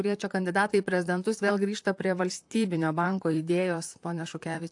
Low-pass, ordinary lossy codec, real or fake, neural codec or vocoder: 10.8 kHz; AAC, 64 kbps; fake; vocoder, 44.1 kHz, 128 mel bands, Pupu-Vocoder